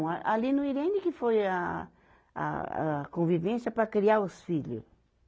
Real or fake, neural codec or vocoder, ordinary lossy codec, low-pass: real; none; none; none